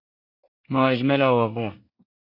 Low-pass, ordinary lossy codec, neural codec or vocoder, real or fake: 5.4 kHz; AAC, 48 kbps; codec, 24 kHz, 1 kbps, SNAC; fake